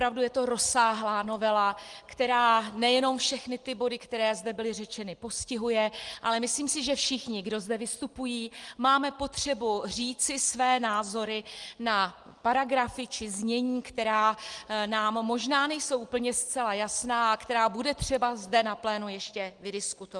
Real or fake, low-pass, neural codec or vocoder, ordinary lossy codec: real; 10.8 kHz; none; Opus, 24 kbps